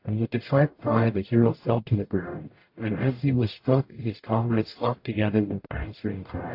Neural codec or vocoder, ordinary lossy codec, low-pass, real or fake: codec, 44.1 kHz, 0.9 kbps, DAC; AAC, 32 kbps; 5.4 kHz; fake